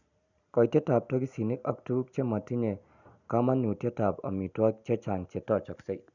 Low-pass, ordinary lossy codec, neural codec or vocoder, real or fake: 7.2 kHz; none; none; real